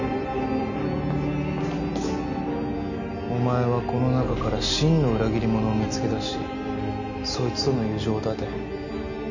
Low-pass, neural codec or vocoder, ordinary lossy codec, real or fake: 7.2 kHz; none; none; real